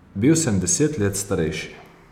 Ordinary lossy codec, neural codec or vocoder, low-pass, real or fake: none; none; 19.8 kHz; real